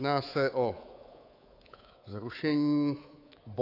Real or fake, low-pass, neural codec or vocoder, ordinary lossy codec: fake; 5.4 kHz; codec, 24 kHz, 3.1 kbps, DualCodec; AAC, 32 kbps